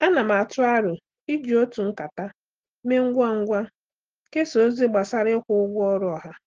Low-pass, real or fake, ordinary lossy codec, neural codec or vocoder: 7.2 kHz; real; Opus, 16 kbps; none